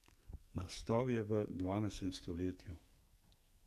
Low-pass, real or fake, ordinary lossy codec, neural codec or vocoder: 14.4 kHz; fake; AAC, 96 kbps; codec, 44.1 kHz, 2.6 kbps, SNAC